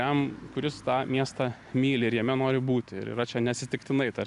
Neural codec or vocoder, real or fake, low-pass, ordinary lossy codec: none; real; 10.8 kHz; AAC, 96 kbps